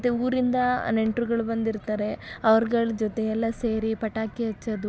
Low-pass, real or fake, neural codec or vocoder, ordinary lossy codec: none; real; none; none